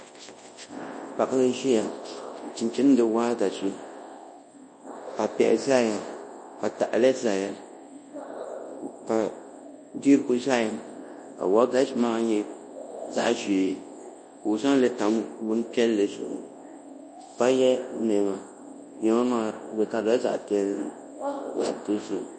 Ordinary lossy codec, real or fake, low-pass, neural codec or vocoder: MP3, 32 kbps; fake; 9.9 kHz; codec, 24 kHz, 0.9 kbps, WavTokenizer, large speech release